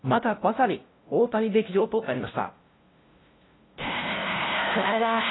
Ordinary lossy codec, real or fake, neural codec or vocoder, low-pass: AAC, 16 kbps; fake; codec, 16 kHz, 0.5 kbps, FunCodec, trained on LibriTTS, 25 frames a second; 7.2 kHz